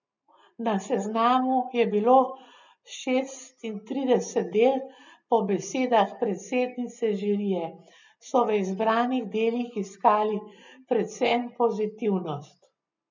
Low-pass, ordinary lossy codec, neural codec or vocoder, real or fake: 7.2 kHz; none; none; real